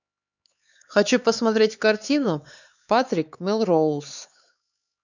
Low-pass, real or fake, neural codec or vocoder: 7.2 kHz; fake; codec, 16 kHz, 4 kbps, X-Codec, HuBERT features, trained on LibriSpeech